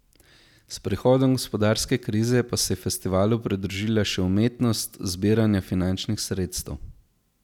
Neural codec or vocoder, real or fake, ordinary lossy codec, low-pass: none; real; none; 19.8 kHz